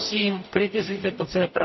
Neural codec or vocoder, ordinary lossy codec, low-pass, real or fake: codec, 44.1 kHz, 0.9 kbps, DAC; MP3, 24 kbps; 7.2 kHz; fake